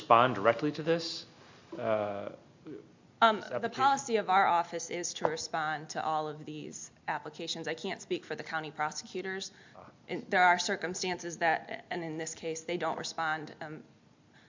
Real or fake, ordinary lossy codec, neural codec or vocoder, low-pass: real; MP3, 64 kbps; none; 7.2 kHz